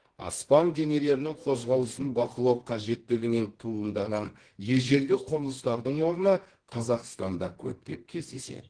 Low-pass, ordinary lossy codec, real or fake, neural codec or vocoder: 9.9 kHz; Opus, 16 kbps; fake; codec, 24 kHz, 0.9 kbps, WavTokenizer, medium music audio release